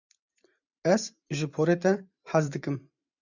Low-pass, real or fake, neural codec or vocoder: 7.2 kHz; fake; vocoder, 44.1 kHz, 128 mel bands every 512 samples, BigVGAN v2